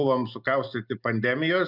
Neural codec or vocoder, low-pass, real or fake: none; 5.4 kHz; real